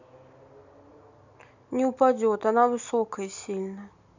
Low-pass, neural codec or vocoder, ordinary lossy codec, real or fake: 7.2 kHz; none; none; real